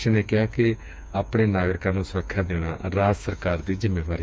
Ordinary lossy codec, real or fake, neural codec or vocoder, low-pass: none; fake; codec, 16 kHz, 4 kbps, FreqCodec, smaller model; none